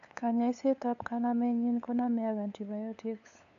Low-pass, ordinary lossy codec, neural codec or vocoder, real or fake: 7.2 kHz; AAC, 96 kbps; codec, 16 kHz, 8 kbps, FunCodec, trained on LibriTTS, 25 frames a second; fake